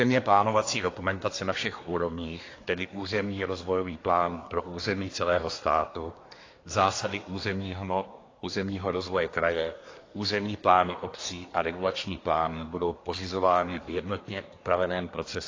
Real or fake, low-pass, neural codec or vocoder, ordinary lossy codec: fake; 7.2 kHz; codec, 24 kHz, 1 kbps, SNAC; AAC, 32 kbps